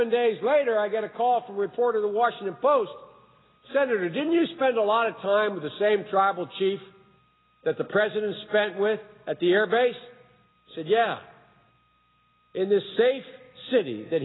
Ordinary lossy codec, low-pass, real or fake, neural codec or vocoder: AAC, 16 kbps; 7.2 kHz; real; none